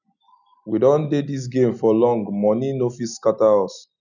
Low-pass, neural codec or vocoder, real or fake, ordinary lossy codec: 7.2 kHz; none; real; none